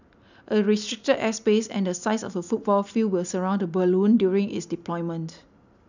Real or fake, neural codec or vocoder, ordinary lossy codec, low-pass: real; none; none; 7.2 kHz